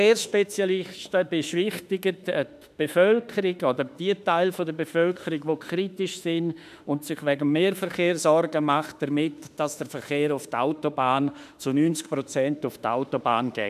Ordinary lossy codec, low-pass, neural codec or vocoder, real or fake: none; 14.4 kHz; autoencoder, 48 kHz, 32 numbers a frame, DAC-VAE, trained on Japanese speech; fake